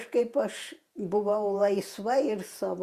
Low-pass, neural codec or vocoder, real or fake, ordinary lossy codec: 14.4 kHz; vocoder, 48 kHz, 128 mel bands, Vocos; fake; Opus, 64 kbps